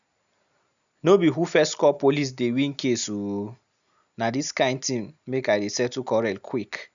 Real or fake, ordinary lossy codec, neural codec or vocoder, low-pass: real; none; none; 7.2 kHz